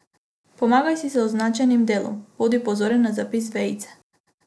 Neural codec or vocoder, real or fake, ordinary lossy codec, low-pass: none; real; none; none